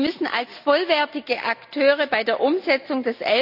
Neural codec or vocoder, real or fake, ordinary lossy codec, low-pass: none; real; none; 5.4 kHz